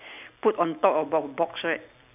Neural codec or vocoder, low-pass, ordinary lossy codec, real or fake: none; 3.6 kHz; none; real